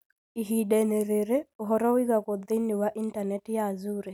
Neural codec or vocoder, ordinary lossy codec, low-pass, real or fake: none; none; none; real